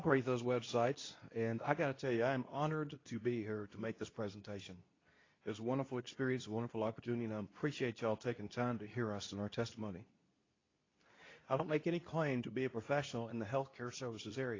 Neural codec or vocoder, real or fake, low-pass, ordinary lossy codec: codec, 24 kHz, 0.9 kbps, WavTokenizer, medium speech release version 2; fake; 7.2 kHz; AAC, 32 kbps